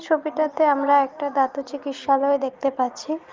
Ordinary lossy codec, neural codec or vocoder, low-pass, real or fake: Opus, 32 kbps; none; 7.2 kHz; real